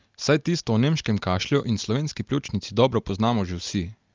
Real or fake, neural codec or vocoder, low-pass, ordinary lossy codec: real; none; 7.2 kHz; Opus, 24 kbps